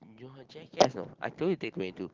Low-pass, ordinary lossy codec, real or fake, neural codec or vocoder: 7.2 kHz; Opus, 16 kbps; real; none